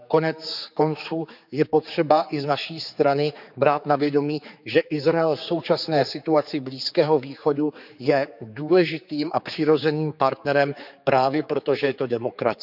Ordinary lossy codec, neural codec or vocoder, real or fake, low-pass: none; codec, 16 kHz, 4 kbps, X-Codec, HuBERT features, trained on general audio; fake; 5.4 kHz